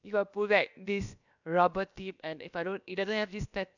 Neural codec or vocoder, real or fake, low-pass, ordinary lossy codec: codec, 16 kHz, about 1 kbps, DyCAST, with the encoder's durations; fake; 7.2 kHz; none